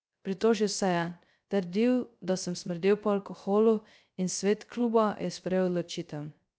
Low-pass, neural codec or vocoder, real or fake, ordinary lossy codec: none; codec, 16 kHz, 0.3 kbps, FocalCodec; fake; none